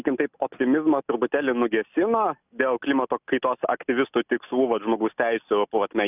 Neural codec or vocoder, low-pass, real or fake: none; 3.6 kHz; real